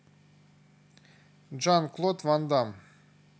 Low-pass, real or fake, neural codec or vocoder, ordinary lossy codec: none; real; none; none